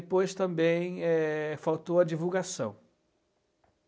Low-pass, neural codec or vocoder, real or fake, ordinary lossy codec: none; none; real; none